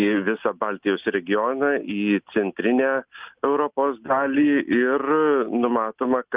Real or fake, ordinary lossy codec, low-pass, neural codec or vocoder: real; Opus, 32 kbps; 3.6 kHz; none